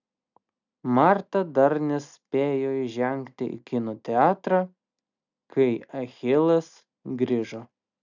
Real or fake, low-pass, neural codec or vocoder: real; 7.2 kHz; none